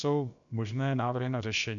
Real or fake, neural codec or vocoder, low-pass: fake; codec, 16 kHz, about 1 kbps, DyCAST, with the encoder's durations; 7.2 kHz